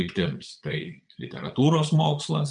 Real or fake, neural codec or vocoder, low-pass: fake; vocoder, 22.05 kHz, 80 mel bands, Vocos; 9.9 kHz